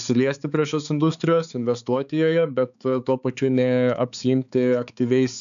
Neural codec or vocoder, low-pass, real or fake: codec, 16 kHz, 4 kbps, X-Codec, HuBERT features, trained on balanced general audio; 7.2 kHz; fake